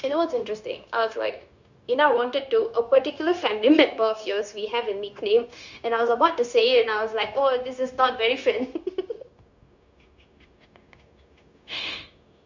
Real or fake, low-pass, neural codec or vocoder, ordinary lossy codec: fake; 7.2 kHz; codec, 16 kHz, 0.9 kbps, LongCat-Audio-Codec; Opus, 64 kbps